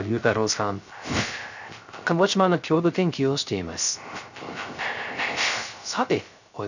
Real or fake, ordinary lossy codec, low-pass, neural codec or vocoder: fake; none; 7.2 kHz; codec, 16 kHz, 0.3 kbps, FocalCodec